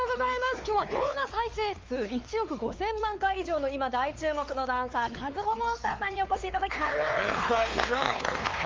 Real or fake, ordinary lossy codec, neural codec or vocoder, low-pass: fake; Opus, 32 kbps; codec, 16 kHz, 4 kbps, X-Codec, WavLM features, trained on Multilingual LibriSpeech; 7.2 kHz